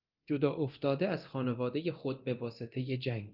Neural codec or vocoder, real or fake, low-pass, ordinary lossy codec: codec, 24 kHz, 0.9 kbps, DualCodec; fake; 5.4 kHz; Opus, 24 kbps